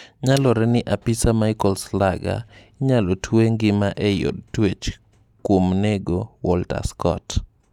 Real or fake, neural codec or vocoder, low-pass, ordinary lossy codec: real; none; 19.8 kHz; none